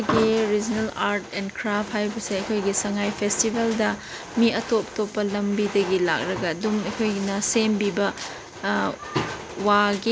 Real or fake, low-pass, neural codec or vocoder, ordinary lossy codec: real; none; none; none